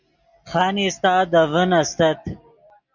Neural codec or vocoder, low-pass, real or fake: none; 7.2 kHz; real